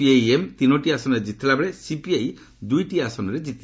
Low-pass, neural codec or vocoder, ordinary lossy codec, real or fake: none; none; none; real